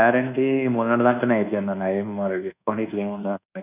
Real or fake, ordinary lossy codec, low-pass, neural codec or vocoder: fake; none; 3.6 kHz; codec, 24 kHz, 1.2 kbps, DualCodec